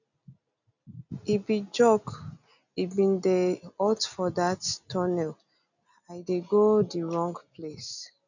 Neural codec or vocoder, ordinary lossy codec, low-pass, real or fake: none; none; 7.2 kHz; real